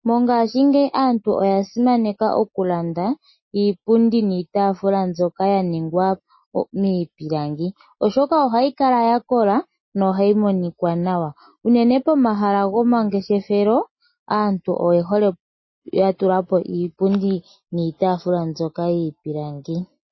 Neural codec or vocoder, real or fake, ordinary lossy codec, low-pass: none; real; MP3, 24 kbps; 7.2 kHz